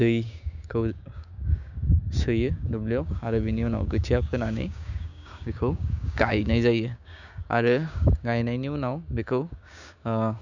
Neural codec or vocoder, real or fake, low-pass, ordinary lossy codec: codec, 16 kHz, 6 kbps, DAC; fake; 7.2 kHz; none